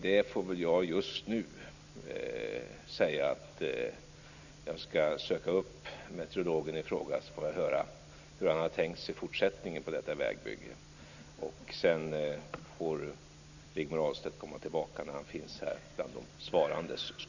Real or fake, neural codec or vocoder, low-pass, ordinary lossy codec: real; none; 7.2 kHz; none